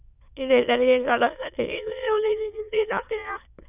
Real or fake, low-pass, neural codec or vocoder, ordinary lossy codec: fake; 3.6 kHz; autoencoder, 22.05 kHz, a latent of 192 numbers a frame, VITS, trained on many speakers; none